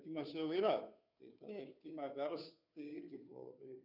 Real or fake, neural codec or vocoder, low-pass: fake; codec, 16 kHz, 2 kbps, FunCodec, trained on Chinese and English, 25 frames a second; 5.4 kHz